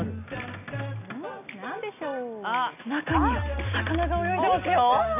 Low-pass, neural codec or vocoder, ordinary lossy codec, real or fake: 3.6 kHz; none; none; real